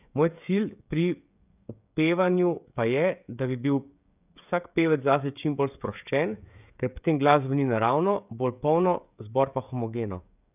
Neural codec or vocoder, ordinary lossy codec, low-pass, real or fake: codec, 16 kHz, 16 kbps, FreqCodec, smaller model; none; 3.6 kHz; fake